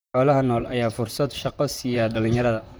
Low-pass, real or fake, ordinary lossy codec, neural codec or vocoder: none; fake; none; vocoder, 44.1 kHz, 128 mel bands, Pupu-Vocoder